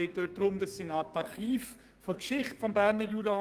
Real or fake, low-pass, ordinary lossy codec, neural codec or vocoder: fake; 14.4 kHz; Opus, 32 kbps; codec, 32 kHz, 1.9 kbps, SNAC